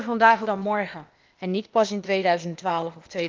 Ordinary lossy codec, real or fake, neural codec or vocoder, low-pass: Opus, 32 kbps; fake; codec, 16 kHz, 0.8 kbps, ZipCodec; 7.2 kHz